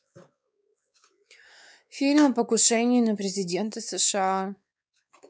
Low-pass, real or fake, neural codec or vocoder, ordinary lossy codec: none; fake; codec, 16 kHz, 4 kbps, X-Codec, WavLM features, trained on Multilingual LibriSpeech; none